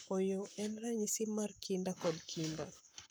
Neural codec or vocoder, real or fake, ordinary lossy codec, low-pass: codec, 44.1 kHz, 7.8 kbps, Pupu-Codec; fake; none; none